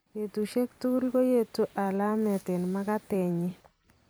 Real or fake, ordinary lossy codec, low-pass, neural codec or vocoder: fake; none; none; vocoder, 44.1 kHz, 128 mel bands every 256 samples, BigVGAN v2